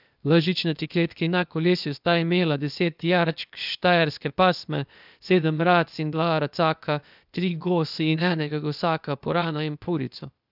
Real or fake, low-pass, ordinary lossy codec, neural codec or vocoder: fake; 5.4 kHz; none; codec, 16 kHz, 0.8 kbps, ZipCodec